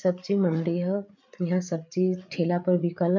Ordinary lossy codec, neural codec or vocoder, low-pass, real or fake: none; codec, 16 kHz, 8 kbps, FreqCodec, larger model; 7.2 kHz; fake